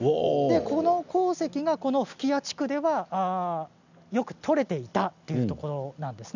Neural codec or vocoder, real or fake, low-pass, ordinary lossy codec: none; real; 7.2 kHz; none